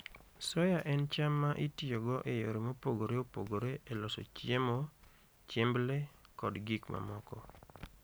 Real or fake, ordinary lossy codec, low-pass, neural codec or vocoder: real; none; none; none